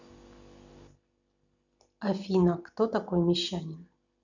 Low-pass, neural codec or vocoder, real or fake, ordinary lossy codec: 7.2 kHz; none; real; none